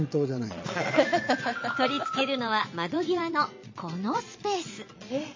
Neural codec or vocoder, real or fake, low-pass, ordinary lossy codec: vocoder, 22.05 kHz, 80 mel bands, Vocos; fake; 7.2 kHz; MP3, 32 kbps